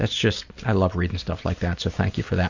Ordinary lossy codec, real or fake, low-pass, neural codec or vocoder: Opus, 64 kbps; real; 7.2 kHz; none